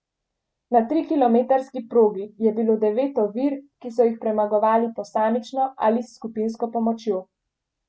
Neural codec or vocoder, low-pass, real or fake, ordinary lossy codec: none; none; real; none